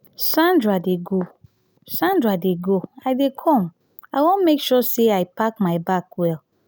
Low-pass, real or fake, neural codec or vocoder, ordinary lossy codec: none; real; none; none